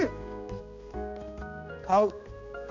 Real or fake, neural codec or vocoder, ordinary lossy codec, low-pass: fake; codec, 16 kHz, 2 kbps, X-Codec, HuBERT features, trained on balanced general audio; none; 7.2 kHz